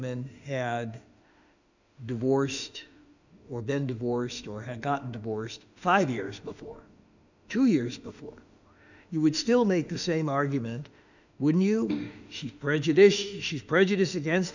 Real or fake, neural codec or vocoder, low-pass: fake; autoencoder, 48 kHz, 32 numbers a frame, DAC-VAE, trained on Japanese speech; 7.2 kHz